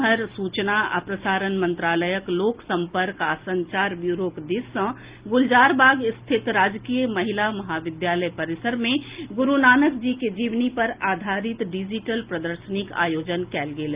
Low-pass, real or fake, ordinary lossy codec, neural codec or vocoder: 3.6 kHz; real; Opus, 24 kbps; none